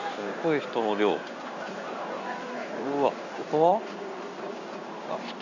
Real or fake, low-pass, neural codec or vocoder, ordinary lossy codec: fake; 7.2 kHz; codec, 16 kHz in and 24 kHz out, 1 kbps, XY-Tokenizer; none